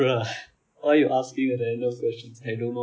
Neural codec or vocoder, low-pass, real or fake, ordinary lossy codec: none; none; real; none